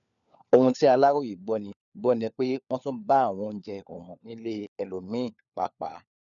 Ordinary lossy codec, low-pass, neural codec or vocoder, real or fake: none; 7.2 kHz; codec, 16 kHz, 4 kbps, FunCodec, trained on LibriTTS, 50 frames a second; fake